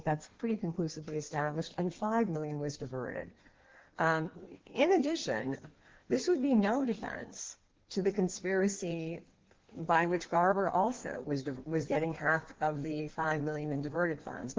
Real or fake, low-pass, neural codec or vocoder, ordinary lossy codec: fake; 7.2 kHz; codec, 16 kHz in and 24 kHz out, 1.1 kbps, FireRedTTS-2 codec; Opus, 16 kbps